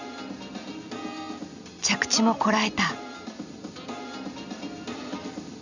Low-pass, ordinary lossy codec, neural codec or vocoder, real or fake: 7.2 kHz; none; none; real